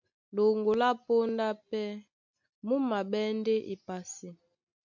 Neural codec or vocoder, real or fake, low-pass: none; real; 7.2 kHz